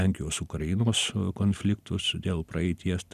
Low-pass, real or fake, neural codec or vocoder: 14.4 kHz; real; none